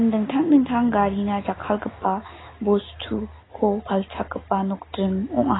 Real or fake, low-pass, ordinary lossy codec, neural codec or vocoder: real; 7.2 kHz; AAC, 16 kbps; none